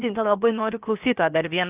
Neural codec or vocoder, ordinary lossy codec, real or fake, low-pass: codec, 16 kHz, about 1 kbps, DyCAST, with the encoder's durations; Opus, 24 kbps; fake; 3.6 kHz